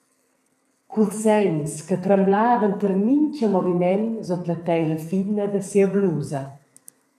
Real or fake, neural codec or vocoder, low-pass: fake; codec, 44.1 kHz, 2.6 kbps, SNAC; 14.4 kHz